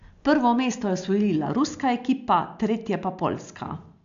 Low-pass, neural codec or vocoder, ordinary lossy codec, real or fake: 7.2 kHz; none; AAC, 64 kbps; real